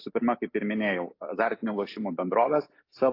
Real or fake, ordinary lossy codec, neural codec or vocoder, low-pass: real; AAC, 32 kbps; none; 5.4 kHz